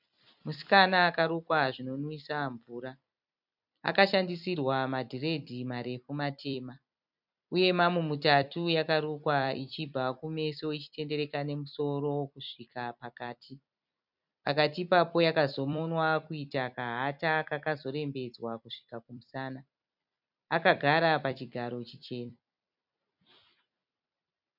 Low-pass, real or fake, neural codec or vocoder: 5.4 kHz; fake; vocoder, 44.1 kHz, 128 mel bands every 256 samples, BigVGAN v2